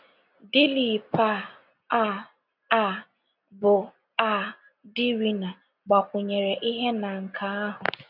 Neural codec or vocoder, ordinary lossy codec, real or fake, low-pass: none; none; real; 5.4 kHz